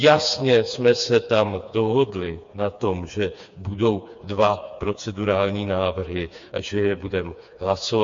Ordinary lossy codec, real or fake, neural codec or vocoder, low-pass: MP3, 48 kbps; fake; codec, 16 kHz, 4 kbps, FreqCodec, smaller model; 7.2 kHz